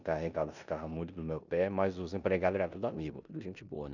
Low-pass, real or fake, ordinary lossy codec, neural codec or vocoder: 7.2 kHz; fake; none; codec, 16 kHz in and 24 kHz out, 0.9 kbps, LongCat-Audio-Codec, four codebook decoder